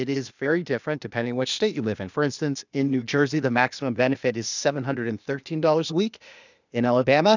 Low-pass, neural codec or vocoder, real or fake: 7.2 kHz; codec, 16 kHz, 0.8 kbps, ZipCodec; fake